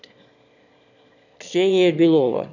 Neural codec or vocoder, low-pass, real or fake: autoencoder, 22.05 kHz, a latent of 192 numbers a frame, VITS, trained on one speaker; 7.2 kHz; fake